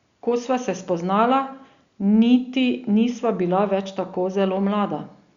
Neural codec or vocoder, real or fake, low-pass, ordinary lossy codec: none; real; 7.2 kHz; Opus, 64 kbps